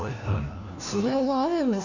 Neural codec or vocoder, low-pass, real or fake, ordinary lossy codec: codec, 16 kHz, 1 kbps, FunCodec, trained on LibriTTS, 50 frames a second; 7.2 kHz; fake; none